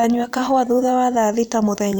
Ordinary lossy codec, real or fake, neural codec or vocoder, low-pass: none; fake; vocoder, 44.1 kHz, 128 mel bands every 512 samples, BigVGAN v2; none